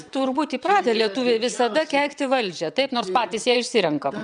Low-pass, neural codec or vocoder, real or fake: 9.9 kHz; vocoder, 22.05 kHz, 80 mel bands, WaveNeXt; fake